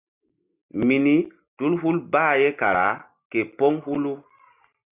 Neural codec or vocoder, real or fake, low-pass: none; real; 3.6 kHz